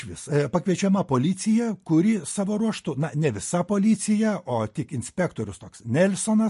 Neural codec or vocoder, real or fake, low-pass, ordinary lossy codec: none; real; 14.4 kHz; MP3, 48 kbps